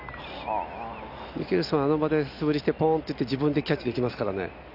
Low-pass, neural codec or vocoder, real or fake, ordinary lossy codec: 5.4 kHz; none; real; none